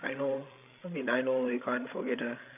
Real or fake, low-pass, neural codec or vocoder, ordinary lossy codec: fake; 3.6 kHz; codec, 16 kHz, 16 kbps, FreqCodec, larger model; AAC, 32 kbps